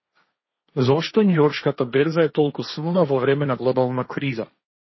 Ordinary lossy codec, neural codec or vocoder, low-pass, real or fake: MP3, 24 kbps; codec, 16 kHz, 1.1 kbps, Voila-Tokenizer; 7.2 kHz; fake